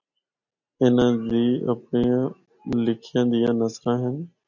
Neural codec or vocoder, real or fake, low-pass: none; real; 7.2 kHz